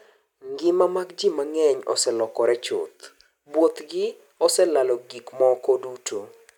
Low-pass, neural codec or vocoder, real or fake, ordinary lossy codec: 19.8 kHz; none; real; none